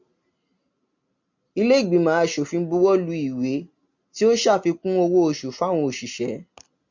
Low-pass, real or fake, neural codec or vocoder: 7.2 kHz; real; none